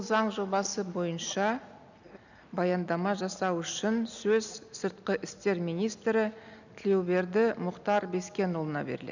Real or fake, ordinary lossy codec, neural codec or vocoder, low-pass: real; none; none; 7.2 kHz